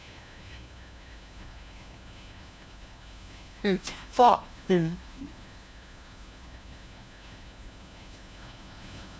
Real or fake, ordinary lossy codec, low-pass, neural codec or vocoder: fake; none; none; codec, 16 kHz, 1 kbps, FunCodec, trained on LibriTTS, 50 frames a second